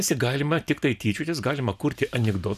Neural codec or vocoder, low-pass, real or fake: none; 14.4 kHz; real